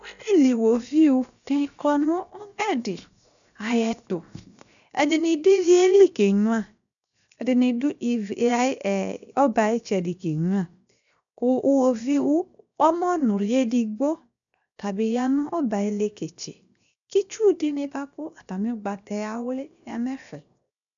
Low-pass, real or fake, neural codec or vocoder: 7.2 kHz; fake; codec, 16 kHz, 0.7 kbps, FocalCodec